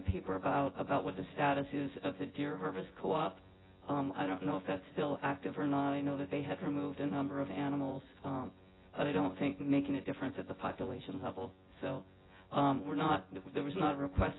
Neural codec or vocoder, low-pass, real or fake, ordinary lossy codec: vocoder, 24 kHz, 100 mel bands, Vocos; 7.2 kHz; fake; AAC, 16 kbps